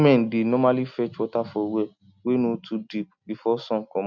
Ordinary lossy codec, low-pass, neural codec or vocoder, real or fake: none; 7.2 kHz; none; real